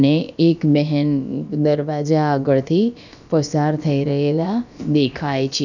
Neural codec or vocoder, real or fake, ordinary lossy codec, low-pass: codec, 16 kHz, about 1 kbps, DyCAST, with the encoder's durations; fake; none; 7.2 kHz